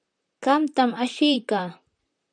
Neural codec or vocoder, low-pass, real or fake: vocoder, 44.1 kHz, 128 mel bands, Pupu-Vocoder; 9.9 kHz; fake